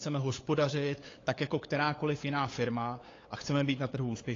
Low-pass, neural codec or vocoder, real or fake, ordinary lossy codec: 7.2 kHz; codec, 16 kHz, 8 kbps, FunCodec, trained on LibriTTS, 25 frames a second; fake; AAC, 32 kbps